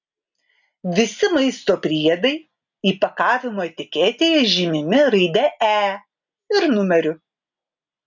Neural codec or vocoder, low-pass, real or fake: none; 7.2 kHz; real